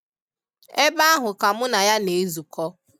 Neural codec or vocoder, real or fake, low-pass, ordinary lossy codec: none; real; 19.8 kHz; none